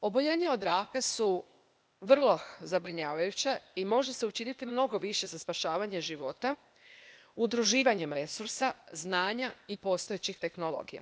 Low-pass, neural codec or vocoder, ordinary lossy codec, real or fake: none; codec, 16 kHz, 0.8 kbps, ZipCodec; none; fake